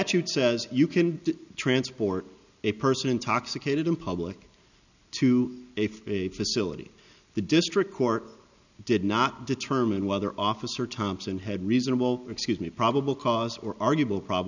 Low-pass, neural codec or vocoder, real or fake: 7.2 kHz; none; real